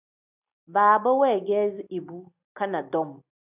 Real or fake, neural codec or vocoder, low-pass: real; none; 3.6 kHz